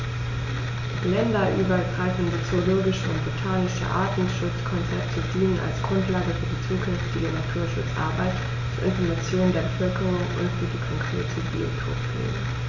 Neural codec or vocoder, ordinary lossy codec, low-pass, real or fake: none; AAC, 48 kbps; 7.2 kHz; real